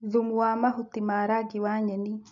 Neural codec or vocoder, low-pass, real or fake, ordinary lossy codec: none; 7.2 kHz; real; none